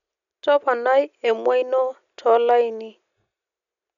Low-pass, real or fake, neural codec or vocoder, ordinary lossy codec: 7.2 kHz; real; none; none